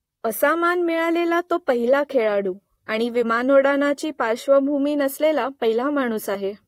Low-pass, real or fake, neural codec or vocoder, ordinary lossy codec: 19.8 kHz; fake; vocoder, 44.1 kHz, 128 mel bands, Pupu-Vocoder; AAC, 48 kbps